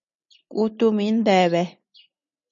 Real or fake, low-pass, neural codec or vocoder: real; 7.2 kHz; none